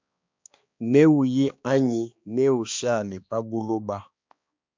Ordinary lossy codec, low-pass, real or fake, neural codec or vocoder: MP3, 64 kbps; 7.2 kHz; fake; codec, 16 kHz, 2 kbps, X-Codec, HuBERT features, trained on balanced general audio